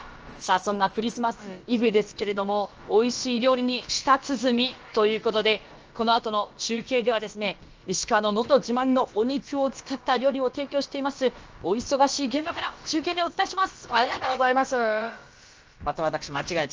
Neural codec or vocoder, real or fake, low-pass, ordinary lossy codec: codec, 16 kHz, about 1 kbps, DyCAST, with the encoder's durations; fake; 7.2 kHz; Opus, 24 kbps